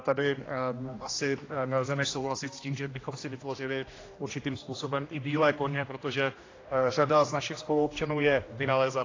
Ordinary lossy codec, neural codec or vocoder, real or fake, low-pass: AAC, 32 kbps; codec, 16 kHz, 1 kbps, X-Codec, HuBERT features, trained on general audio; fake; 7.2 kHz